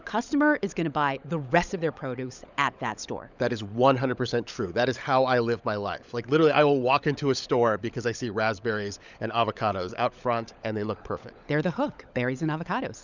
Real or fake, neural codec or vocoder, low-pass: fake; codec, 16 kHz, 16 kbps, FunCodec, trained on LibriTTS, 50 frames a second; 7.2 kHz